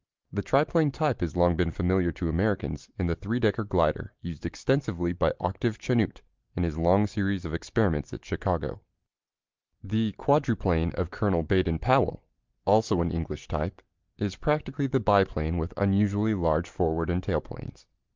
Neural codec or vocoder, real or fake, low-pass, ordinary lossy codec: none; real; 7.2 kHz; Opus, 32 kbps